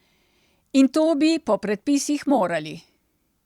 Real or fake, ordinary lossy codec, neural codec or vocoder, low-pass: fake; Opus, 64 kbps; vocoder, 44.1 kHz, 128 mel bands every 256 samples, BigVGAN v2; 19.8 kHz